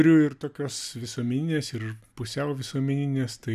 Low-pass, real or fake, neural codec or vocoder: 14.4 kHz; real; none